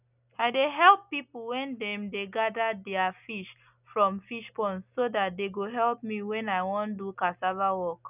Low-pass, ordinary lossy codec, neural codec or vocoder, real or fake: 3.6 kHz; none; none; real